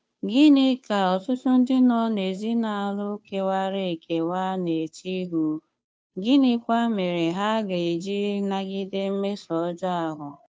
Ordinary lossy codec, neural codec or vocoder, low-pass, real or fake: none; codec, 16 kHz, 2 kbps, FunCodec, trained on Chinese and English, 25 frames a second; none; fake